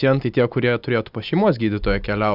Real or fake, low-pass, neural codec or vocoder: real; 5.4 kHz; none